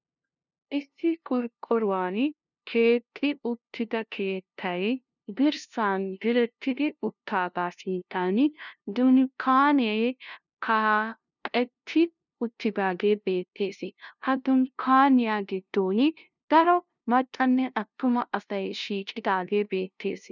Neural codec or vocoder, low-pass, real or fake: codec, 16 kHz, 0.5 kbps, FunCodec, trained on LibriTTS, 25 frames a second; 7.2 kHz; fake